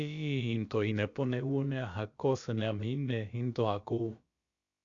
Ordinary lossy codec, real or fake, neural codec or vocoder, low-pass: Opus, 64 kbps; fake; codec, 16 kHz, about 1 kbps, DyCAST, with the encoder's durations; 7.2 kHz